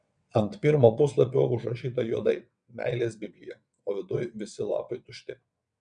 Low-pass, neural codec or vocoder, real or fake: 9.9 kHz; vocoder, 22.05 kHz, 80 mel bands, WaveNeXt; fake